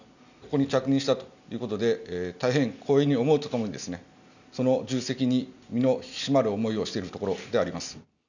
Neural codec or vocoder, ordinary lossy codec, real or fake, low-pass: none; none; real; 7.2 kHz